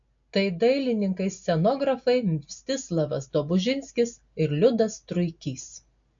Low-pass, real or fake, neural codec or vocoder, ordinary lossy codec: 7.2 kHz; real; none; AAC, 64 kbps